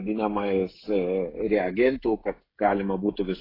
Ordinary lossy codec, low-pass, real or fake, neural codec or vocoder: AAC, 24 kbps; 5.4 kHz; fake; codec, 16 kHz, 16 kbps, FreqCodec, smaller model